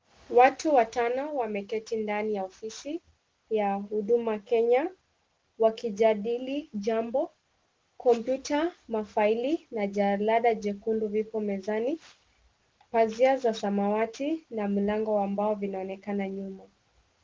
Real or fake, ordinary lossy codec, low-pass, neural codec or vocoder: real; Opus, 16 kbps; 7.2 kHz; none